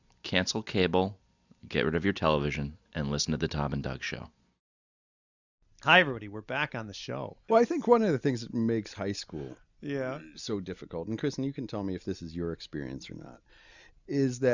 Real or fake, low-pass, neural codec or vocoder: real; 7.2 kHz; none